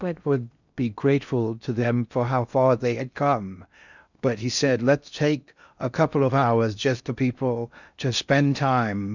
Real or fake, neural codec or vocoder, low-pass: fake; codec, 16 kHz in and 24 kHz out, 0.6 kbps, FocalCodec, streaming, 2048 codes; 7.2 kHz